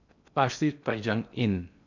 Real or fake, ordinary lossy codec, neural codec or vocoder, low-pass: fake; none; codec, 16 kHz in and 24 kHz out, 0.6 kbps, FocalCodec, streaming, 2048 codes; 7.2 kHz